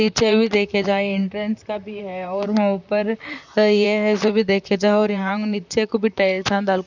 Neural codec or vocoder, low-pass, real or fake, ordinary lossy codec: vocoder, 44.1 kHz, 128 mel bands, Pupu-Vocoder; 7.2 kHz; fake; none